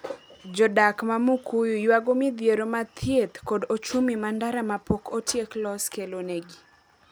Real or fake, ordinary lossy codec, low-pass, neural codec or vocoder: real; none; none; none